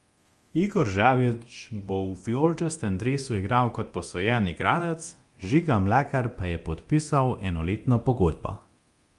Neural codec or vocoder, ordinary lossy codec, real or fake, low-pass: codec, 24 kHz, 0.9 kbps, DualCodec; Opus, 32 kbps; fake; 10.8 kHz